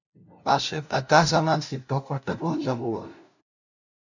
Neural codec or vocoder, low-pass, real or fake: codec, 16 kHz, 0.5 kbps, FunCodec, trained on LibriTTS, 25 frames a second; 7.2 kHz; fake